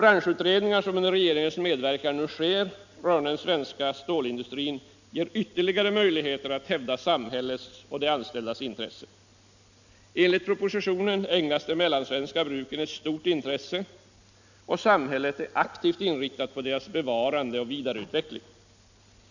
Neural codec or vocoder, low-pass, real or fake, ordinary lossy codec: none; 7.2 kHz; real; none